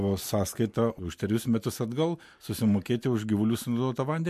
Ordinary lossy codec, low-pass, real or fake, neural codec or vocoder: MP3, 64 kbps; 14.4 kHz; real; none